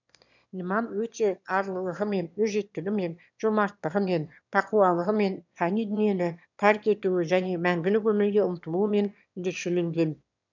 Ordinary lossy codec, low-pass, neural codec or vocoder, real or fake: none; 7.2 kHz; autoencoder, 22.05 kHz, a latent of 192 numbers a frame, VITS, trained on one speaker; fake